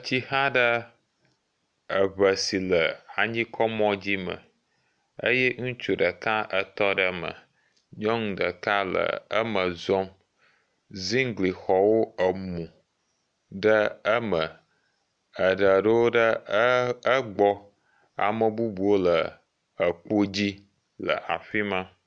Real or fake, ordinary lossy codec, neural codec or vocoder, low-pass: real; MP3, 96 kbps; none; 9.9 kHz